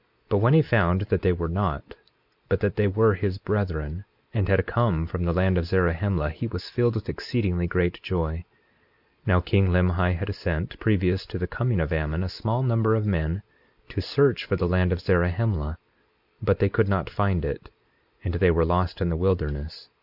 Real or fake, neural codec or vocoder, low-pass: real; none; 5.4 kHz